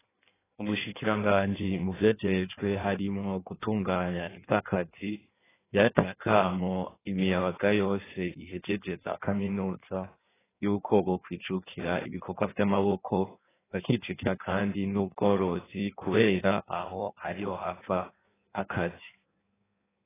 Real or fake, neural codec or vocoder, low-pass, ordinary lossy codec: fake; codec, 16 kHz in and 24 kHz out, 1.1 kbps, FireRedTTS-2 codec; 3.6 kHz; AAC, 16 kbps